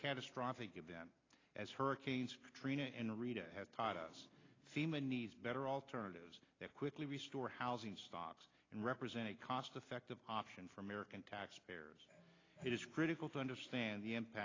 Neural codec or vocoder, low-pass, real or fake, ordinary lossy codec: none; 7.2 kHz; real; AAC, 32 kbps